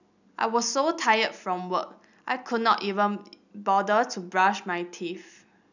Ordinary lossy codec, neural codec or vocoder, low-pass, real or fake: none; none; 7.2 kHz; real